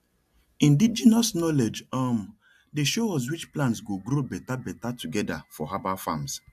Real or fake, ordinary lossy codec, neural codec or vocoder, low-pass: real; AAC, 96 kbps; none; 14.4 kHz